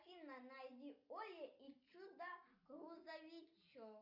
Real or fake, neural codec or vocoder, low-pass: real; none; 5.4 kHz